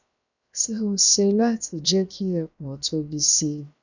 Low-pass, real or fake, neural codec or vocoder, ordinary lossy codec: 7.2 kHz; fake; codec, 16 kHz, 0.7 kbps, FocalCodec; none